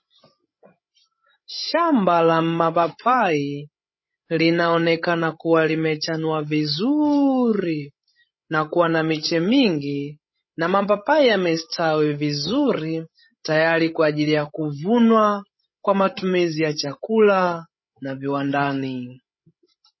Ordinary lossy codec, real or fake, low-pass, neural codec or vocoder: MP3, 24 kbps; fake; 7.2 kHz; codec, 16 kHz, 16 kbps, FreqCodec, larger model